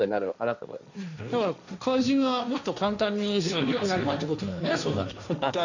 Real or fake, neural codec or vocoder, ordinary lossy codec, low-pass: fake; codec, 16 kHz, 1.1 kbps, Voila-Tokenizer; none; 7.2 kHz